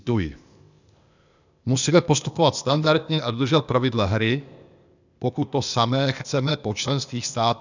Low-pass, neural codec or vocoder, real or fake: 7.2 kHz; codec, 16 kHz, 0.8 kbps, ZipCodec; fake